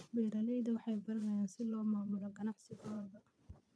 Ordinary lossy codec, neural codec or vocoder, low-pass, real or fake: none; vocoder, 22.05 kHz, 80 mel bands, Vocos; none; fake